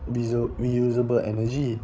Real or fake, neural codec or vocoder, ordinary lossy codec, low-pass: fake; codec, 16 kHz, 16 kbps, FreqCodec, larger model; none; none